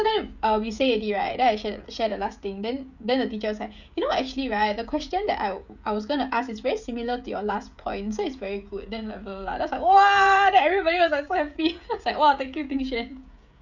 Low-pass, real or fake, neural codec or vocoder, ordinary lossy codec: 7.2 kHz; fake; codec, 16 kHz, 16 kbps, FreqCodec, smaller model; none